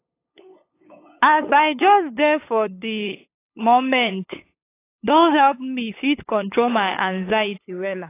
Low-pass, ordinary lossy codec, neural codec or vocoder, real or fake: 3.6 kHz; AAC, 24 kbps; codec, 16 kHz, 8 kbps, FunCodec, trained on LibriTTS, 25 frames a second; fake